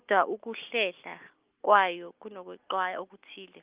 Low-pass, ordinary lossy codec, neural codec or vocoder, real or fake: 3.6 kHz; Opus, 32 kbps; none; real